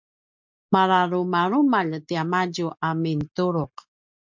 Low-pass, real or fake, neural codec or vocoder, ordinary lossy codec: 7.2 kHz; real; none; MP3, 64 kbps